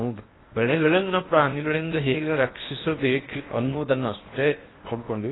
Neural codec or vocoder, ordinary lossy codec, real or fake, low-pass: codec, 16 kHz in and 24 kHz out, 0.8 kbps, FocalCodec, streaming, 65536 codes; AAC, 16 kbps; fake; 7.2 kHz